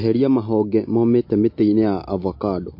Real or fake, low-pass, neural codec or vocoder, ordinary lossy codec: real; 5.4 kHz; none; MP3, 32 kbps